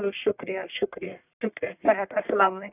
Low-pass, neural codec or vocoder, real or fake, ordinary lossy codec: 3.6 kHz; codec, 44.1 kHz, 1.7 kbps, Pupu-Codec; fake; none